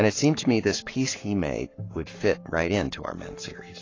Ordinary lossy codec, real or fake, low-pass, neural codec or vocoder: AAC, 32 kbps; fake; 7.2 kHz; codec, 44.1 kHz, 7.8 kbps, Pupu-Codec